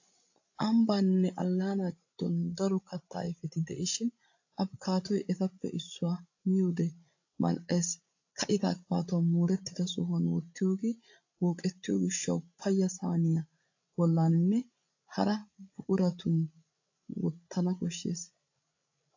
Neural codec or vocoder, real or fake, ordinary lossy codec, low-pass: codec, 16 kHz, 16 kbps, FreqCodec, larger model; fake; AAC, 48 kbps; 7.2 kHz